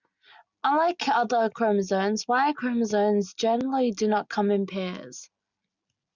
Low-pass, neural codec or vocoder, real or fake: 7.2 kHz; none; real